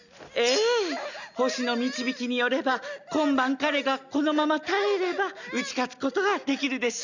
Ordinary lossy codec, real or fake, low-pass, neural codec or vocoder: none; real; 7.2 kHz; none